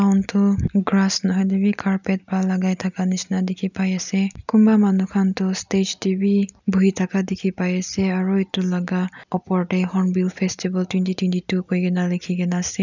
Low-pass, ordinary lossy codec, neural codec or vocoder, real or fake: 7.2 kHz; none; none; real